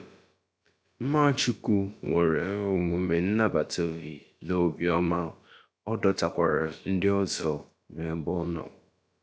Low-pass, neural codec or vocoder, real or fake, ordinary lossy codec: none; codec, 16 kHz, about 1 kbps, DyCAST, with the encoder's durations; fake; none